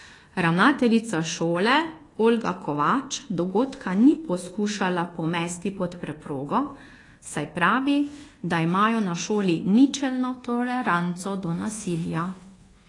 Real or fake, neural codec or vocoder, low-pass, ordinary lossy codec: fake; codec, 24 kHz, 1.2 kbps, DualCodec; 10.8 kHz; AAC, 32 kbps